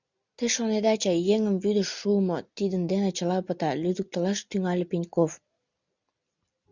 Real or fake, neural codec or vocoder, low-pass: real; none; 7.2 kHz